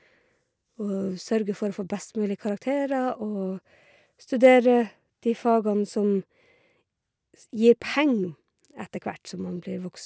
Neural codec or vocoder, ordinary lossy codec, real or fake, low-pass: none; none; real; none